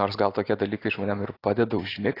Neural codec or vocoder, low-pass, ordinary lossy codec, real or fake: none; 5.4 kHz; AAC, 24 kbps; real